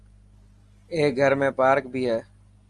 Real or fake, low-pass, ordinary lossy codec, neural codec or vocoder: real; 10.8 kHz; Opus, 32 kbps; none